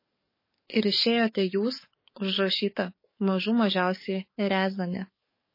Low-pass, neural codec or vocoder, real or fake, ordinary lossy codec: 5.4 kHz; codec, 44.1 kHz, 7.8 kbps, DAC; fake; MP3, 24 kbps